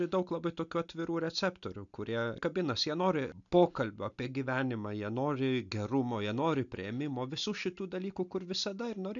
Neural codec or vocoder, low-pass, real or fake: none; 7.2 kHz; real